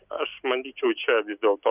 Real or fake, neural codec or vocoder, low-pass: real; none; 3.6 kHz